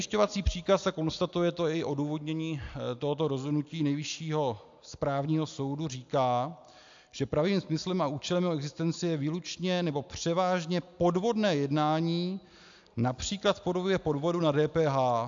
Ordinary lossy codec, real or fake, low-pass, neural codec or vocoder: AAC, 64 kbps; real; 7.2 kHz; none